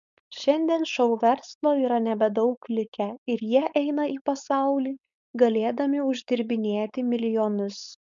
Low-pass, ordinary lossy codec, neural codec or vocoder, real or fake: 7.2 kHz; MP3, 96 kbps; codec, 16 kHz, 4.8 kbps, FACodec; fake